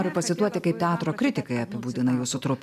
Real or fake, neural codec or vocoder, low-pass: real; none; 14.4 kHz